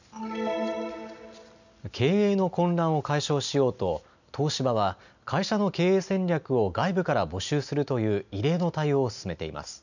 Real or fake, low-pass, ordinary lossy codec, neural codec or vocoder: real; 7.2 kHz; none; none